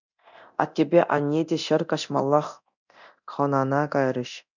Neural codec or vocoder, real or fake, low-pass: codec, 24 kHz, 0.9 kbps, DualCodec; fake; 7.2 kHz